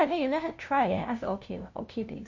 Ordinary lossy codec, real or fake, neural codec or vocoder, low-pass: MP3, 48 kbps; fake; codec, 16 kHz, 0.5 kbps, FunCodec, trained on LibriTTS, 25 frames a second; 7.2 kHz